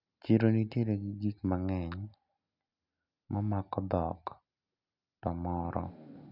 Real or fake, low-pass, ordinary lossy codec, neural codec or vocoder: real; 5.4 kHz; none; none